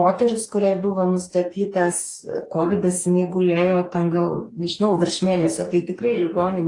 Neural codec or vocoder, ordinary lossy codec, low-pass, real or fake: codec, 44.1 kHz, 2.6 kbps, DAC; AAC, 48 kbps; 10.8 kHz; fake